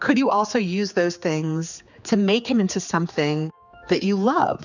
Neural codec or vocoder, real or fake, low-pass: codec, 16 kHz, 4 kbps, X-Codec, HuBERT features, trained on general audio; fake; 7.2 kHz